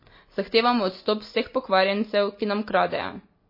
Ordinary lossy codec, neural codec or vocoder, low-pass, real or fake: MP3, 24 kbps; none; 5.4 kHz; real